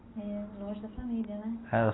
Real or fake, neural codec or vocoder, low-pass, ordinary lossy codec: real; none; 7.2 kHz; AAC, 16 kbps